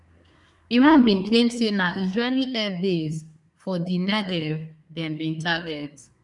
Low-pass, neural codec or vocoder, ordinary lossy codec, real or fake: 10.8 kHz; codec, 24 kHz, 1 kbps, SNAC; none; fake